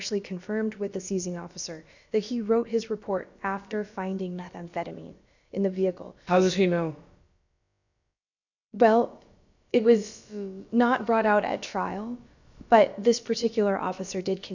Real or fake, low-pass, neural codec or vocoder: fake; 7.2 kHz; codec, 16 kHz, about 1 kbps, DyCAST, with the encoder's durations